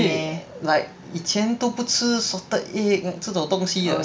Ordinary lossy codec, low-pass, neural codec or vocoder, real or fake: none; none; none; real